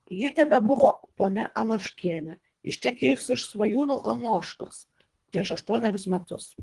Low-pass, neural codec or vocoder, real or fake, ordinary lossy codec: 10.8 kHz; codec, 24 kHz, 1.5 kbps, HILCodec; fake; Opus, 24 kbps